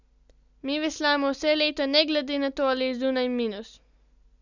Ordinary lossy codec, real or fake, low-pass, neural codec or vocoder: none; real; 7.2 kHz; none